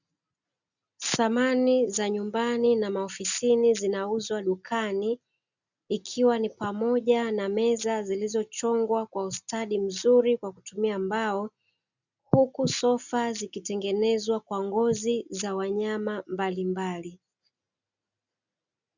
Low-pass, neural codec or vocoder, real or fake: 7.2 kHz; none; real